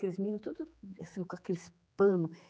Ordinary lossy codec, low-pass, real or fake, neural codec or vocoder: none; none; fake; codec, 16 kHz, 4 kbps, X-Codec, HuBERT features, trained on general audio